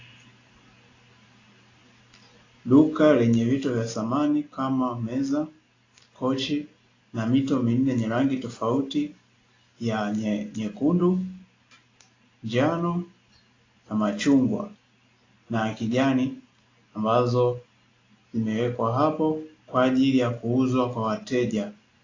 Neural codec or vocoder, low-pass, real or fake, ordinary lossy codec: none; 7.2 kHz; real; AAC, 32 kbps